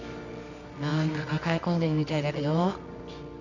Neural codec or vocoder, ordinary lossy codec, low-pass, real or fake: codec, 24 kHz, 0.9 kbps, WavTokenizer, medium music audio release; none; 7.2 kHz; fake